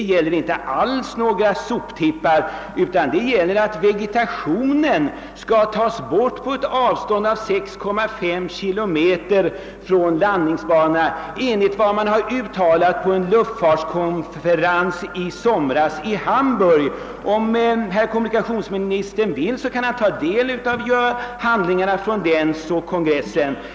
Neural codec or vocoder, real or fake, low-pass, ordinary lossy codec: none; real; none; none